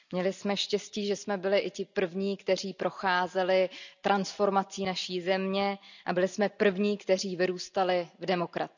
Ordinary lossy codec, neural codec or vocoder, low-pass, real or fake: none; none; 7.2 kHz; real